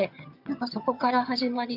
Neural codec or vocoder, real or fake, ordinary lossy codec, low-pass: vocoder, 22.05 kHz, 80 mel bands, HiFi-GAN; fake; none; 5.4 kHz